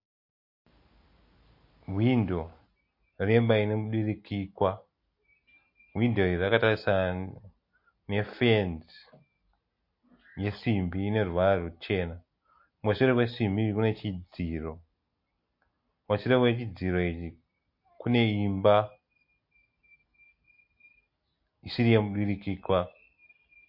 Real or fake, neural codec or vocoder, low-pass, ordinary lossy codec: real; none; 5.4 kHz; MP3, 32 kbps